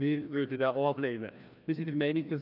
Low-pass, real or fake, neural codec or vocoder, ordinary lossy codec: 5.4 kHz; fake; codec, 16 kHz, 1 kbps, FreqCodec, larger model; none